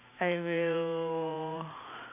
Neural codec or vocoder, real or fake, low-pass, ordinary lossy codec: vocoder, 44.1 kHz, 128 mel bands every 512 samples, BigVGAN v2; fake; 3.6 kHz; AAC, 32 kbps